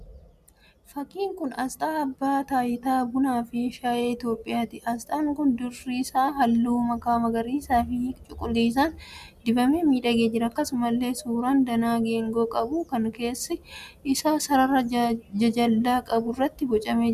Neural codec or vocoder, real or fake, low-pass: none; real; 14.4 kHz